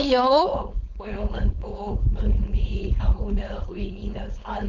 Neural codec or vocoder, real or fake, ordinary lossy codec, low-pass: codec, 16 kHz, 4.8 kbps, FACodec; fake; none; 7.2 kHz